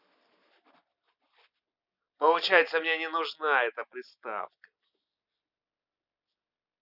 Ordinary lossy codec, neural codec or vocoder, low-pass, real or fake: none; none; 5.4 kHz; real